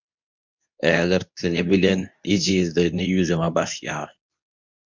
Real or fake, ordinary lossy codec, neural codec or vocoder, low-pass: fake; MP3, 64 kbps; codec, 24 kHz, 0.9 kbps, WavTokenizer, medium speech release version 2; 7.2 kHz